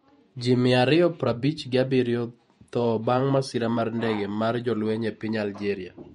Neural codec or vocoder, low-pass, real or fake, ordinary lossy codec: vocoder, 48 kHz, 128 mel bands, Vocos; 19.8 kHz; fake; MP3, 48 kbps